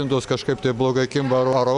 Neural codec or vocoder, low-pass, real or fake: none; 10.8 kHz; real